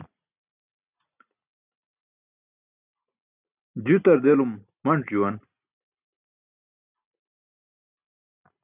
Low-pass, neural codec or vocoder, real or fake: 3.6 kHz; none; real